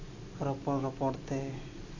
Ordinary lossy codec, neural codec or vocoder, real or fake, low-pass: AAC, 48 kbps; none; real; 7.2 kHz